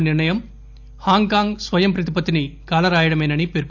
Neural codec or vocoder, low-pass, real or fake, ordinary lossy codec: none; 7.2 kHz; real; none